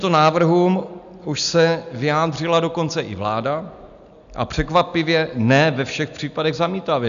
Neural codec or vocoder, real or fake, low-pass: none; real; 7.2 kHz